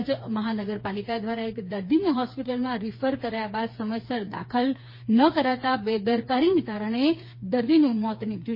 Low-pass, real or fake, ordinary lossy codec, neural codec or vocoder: 5.4 kHz; fake; MP3, 24 kbps; codec, 16 kHz, 4 kbps, FreqCodec, smaller model